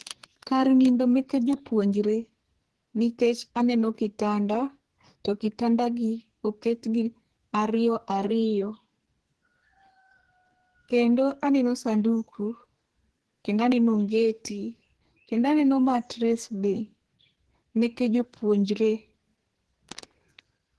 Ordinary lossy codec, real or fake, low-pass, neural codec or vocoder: Opus, 16 kbps; fake; 10.8 kHz; codec, 44.1 kHz, 2.6 kbps, SNAC